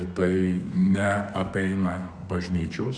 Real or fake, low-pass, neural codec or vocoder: fake; 9.9 kHz; codec, 32 kHz, 1.9 kbps, SNAC